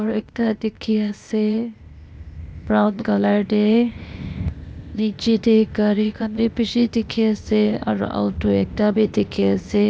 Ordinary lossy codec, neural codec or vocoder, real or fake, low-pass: none; codec, 16 kHz, 0.8 kbps, ZipCodec; fake; none